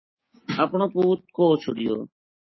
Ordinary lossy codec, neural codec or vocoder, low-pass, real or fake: MP3, 24 kbps; codec, 16 kHz, 6 kbps, DAC; 7.2 kHz; fake